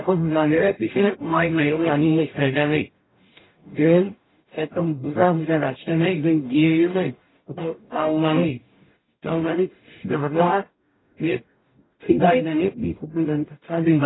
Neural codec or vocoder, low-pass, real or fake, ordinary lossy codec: codec, 44.1 kHz, 0.9 kbps, DAC; 7.2 kHz; fake; AAC, 16 kbps